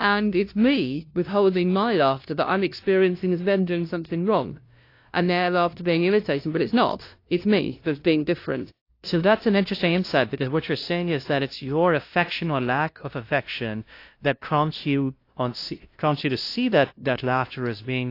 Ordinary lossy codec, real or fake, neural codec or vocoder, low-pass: AAC, 32 kbps; fake; codec, 16 kHz, 0.5 kbps, FunCodec, trained on LibriTTS, 25 frames a second; 5.4 kHz